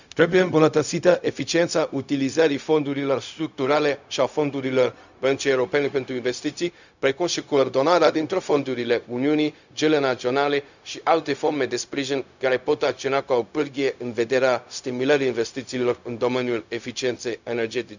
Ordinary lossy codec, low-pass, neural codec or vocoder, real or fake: none; 7.2 kHz; codec, 16 kHz, 0.4 kbps, LongCat-Audio-Codec; fake